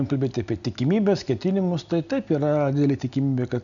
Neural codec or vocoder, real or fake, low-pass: none; real; 7.2 kHz